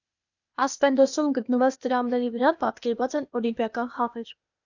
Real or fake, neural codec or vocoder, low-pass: fake; codec, 16 kHz, 0.8 kbps, ZipCodec; 7.2 kHz